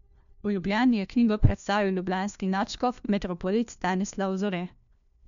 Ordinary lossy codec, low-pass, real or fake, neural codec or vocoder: none; 7.2 kHz; fake; codec, 16 kHz, 1 kbps, FunCodec, trained on LibriTTS, 50 frames a second